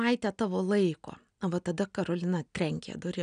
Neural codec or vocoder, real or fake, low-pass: none; real; 9.9 kHz